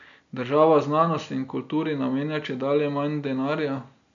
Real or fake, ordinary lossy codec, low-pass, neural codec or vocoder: real; none; 7.2 kHz; none